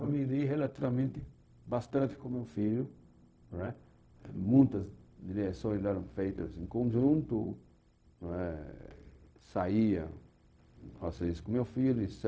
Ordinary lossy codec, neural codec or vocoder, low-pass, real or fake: none; codec, 16 kHz, 0.4 kbps, LongCat-Audio-Codec; none; fake